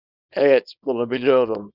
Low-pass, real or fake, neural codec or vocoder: 5.4 kHz; fake; codec, 24 kHz, 0.9 kbps, WavTokenizer, small release